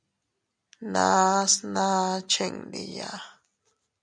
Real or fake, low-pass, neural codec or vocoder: real; 9.9 kHz; none